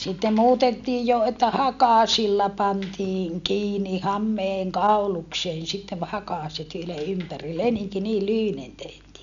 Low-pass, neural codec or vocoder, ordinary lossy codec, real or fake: 7.2 kHz; none; none; real